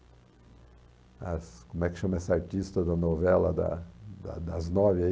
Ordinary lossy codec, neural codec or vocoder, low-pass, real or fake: none; none; none; real